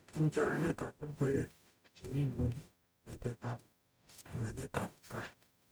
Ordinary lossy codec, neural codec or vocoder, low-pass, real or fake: none; codec, 44.1 kHz, 0.9 kbps, DAC; none; fake